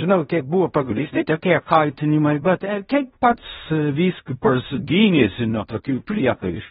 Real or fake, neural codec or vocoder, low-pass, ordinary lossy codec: fake; codec, 16 kHz in and 24 kHz out, 0.4 kbps, LongCat-Audio-Codec, fine tuned four codebook decoder; 10.8 kHz; AAC, 16 kbps